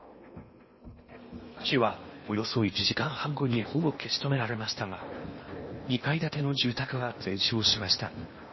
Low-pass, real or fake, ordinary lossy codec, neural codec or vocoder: 7.2 kHz; fake; MP3, 24 kbps; codec, 16 kHz in and 24 kHz out, 0.8 kbps, FocalCodec, streaming, 65536 codes